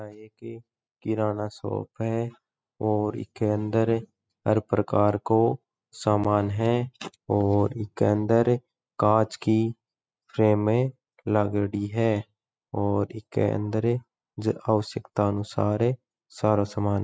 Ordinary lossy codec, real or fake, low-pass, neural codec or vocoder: none; real; none; none